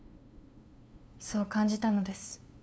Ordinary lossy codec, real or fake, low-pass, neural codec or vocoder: none; fake; none; codec, 16 kHz, 2 kbps, FunCodec, trained on LibriTTS, 25 frames a second